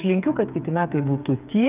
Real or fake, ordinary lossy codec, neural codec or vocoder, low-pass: fake; Opus, 24 kbps; codec, 16 kHz, 8 kbps, FreqCodec, smaller model; 3.6 kHz